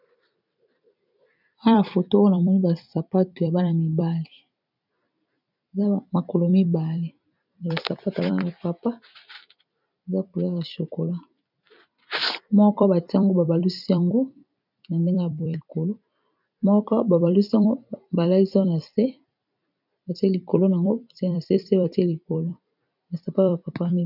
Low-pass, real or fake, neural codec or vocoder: 5.4 kHz; fake; vocoder, 44.1 kHz, 128 mel bands every 256 samples, BigVGAN v2